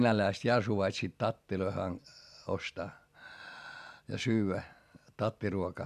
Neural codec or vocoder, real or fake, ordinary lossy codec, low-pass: none; real; MP3, 96 kbps; 14.4 kHz